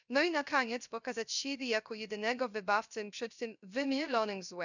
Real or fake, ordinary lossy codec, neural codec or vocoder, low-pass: fake; none; codec, 16 kHz, 0.3 kbps, FocalCodec; 7.2 kHz